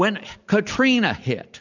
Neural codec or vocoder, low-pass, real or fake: none; 7.2 kHz; real